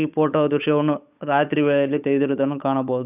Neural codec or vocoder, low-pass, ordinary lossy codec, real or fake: codec, 16 kHz, 8 kbps, FunCodec, trained on Chinese and English, 25 frames a second; 3.6 kHz; none; fake